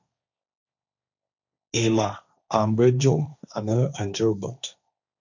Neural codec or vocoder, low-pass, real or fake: codec, 16 kHz, 1.1 kbps, Voila-Tokenizer; 7.2 kHz; fake